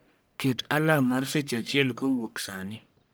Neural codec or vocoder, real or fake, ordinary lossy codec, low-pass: codec, 44.1 kHz, 1.7 kbps, Pupu-Codec; fake; none; none